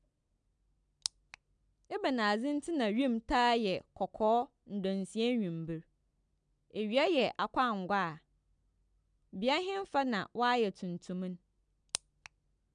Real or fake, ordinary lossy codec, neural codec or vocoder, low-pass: real; none; none; 9.9 kHz